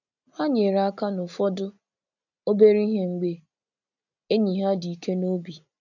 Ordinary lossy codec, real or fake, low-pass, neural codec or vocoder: none; real; 7.2 kHz; none